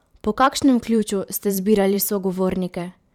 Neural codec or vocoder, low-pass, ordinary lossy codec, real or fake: vocoder, 44.1 kHz, 128 mel bands every 512 samples, BigVGAN v2; 19.8 kHz; none; fake